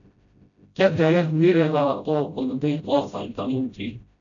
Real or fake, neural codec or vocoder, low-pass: fake; codec, 16 kHz, 0.5 kbps, FreqCodec, smaller model; 7.2 kHz